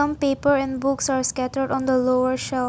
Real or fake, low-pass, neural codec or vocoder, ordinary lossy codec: real; none; none; none